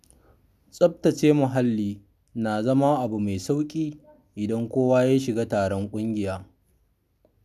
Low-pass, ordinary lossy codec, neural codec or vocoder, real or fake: 14.4 kHz; none; none; real